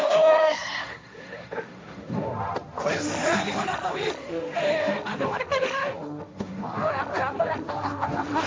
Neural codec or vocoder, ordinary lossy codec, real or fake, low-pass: codec, 16 kHz, 1.1 kbps, Voila-Tokenizer; none; fake; none